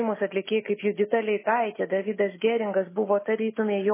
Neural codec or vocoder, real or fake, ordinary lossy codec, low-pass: none; real; MP3, 16 kbps; 3.6 kHz